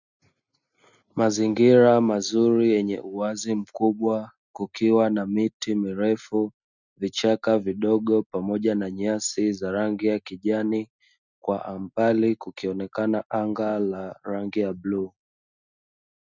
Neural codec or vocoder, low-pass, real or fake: none; 7.2 kHz; real